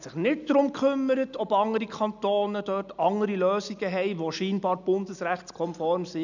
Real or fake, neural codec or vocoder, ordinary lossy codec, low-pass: real; none; none; 7.2 kHz